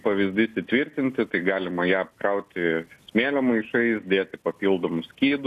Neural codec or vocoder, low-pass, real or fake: none; 14.4 kHz; real